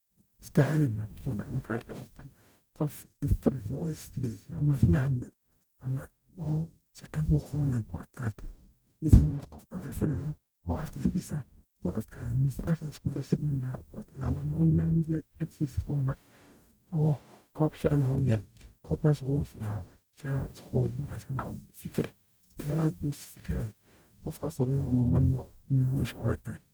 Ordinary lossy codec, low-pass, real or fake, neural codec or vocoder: none; none; fake; codec, 44.1 kHz, 0.9 kbps, DAC